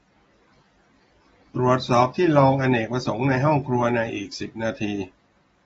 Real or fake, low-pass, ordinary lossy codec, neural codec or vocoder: real; 19.8 kHz; AAC, 24 kbps; none